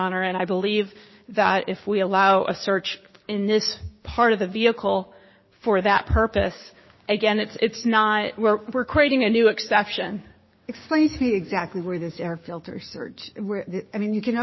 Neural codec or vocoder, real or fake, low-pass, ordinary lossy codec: codec, 16 kHz, 6 kbps, DAC; fake; 7.2 kHz; MP3, 24 kbps